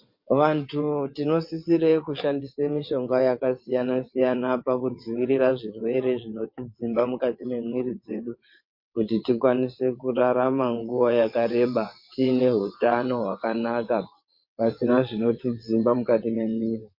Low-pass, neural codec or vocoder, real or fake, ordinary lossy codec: 5.4 kHz; vocoder, 22.05 kHz, 80 mel bands, WaveNeXt; fake; MP3, 32 kbps